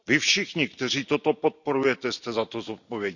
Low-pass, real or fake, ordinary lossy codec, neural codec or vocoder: 7.2 kHz; real; none; none